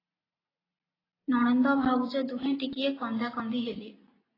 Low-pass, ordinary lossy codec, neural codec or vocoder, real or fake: 5.4 kHz; AAC, 24 kbps; none; real